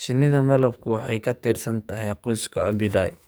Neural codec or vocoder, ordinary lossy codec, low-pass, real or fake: codec, 44.1 kHz, 2.6 kbps, SNAC; none; none; fake